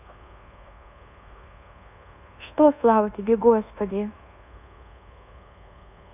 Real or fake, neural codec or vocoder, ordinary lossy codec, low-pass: fake; codec, 24 kHz, 1.2 kbps, DualCodec; none; 3.6 kHz